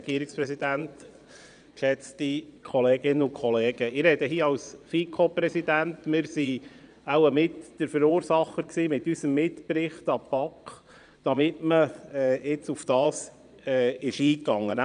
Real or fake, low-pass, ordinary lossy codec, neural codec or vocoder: fake; 9.9 kHz; none; vocoder, 22.05 kHz, 80 mel bands, Vocos